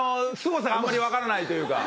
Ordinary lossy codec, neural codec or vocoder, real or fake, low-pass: none; none; real; none